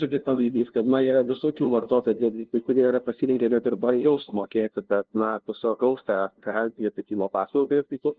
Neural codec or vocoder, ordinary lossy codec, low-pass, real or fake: codec, 16 kHz, 0.5 kbps, FunCodec, trained on LibriTTS, 25 frames a second; Opus, 16 kbps; 7.2 kHz; fake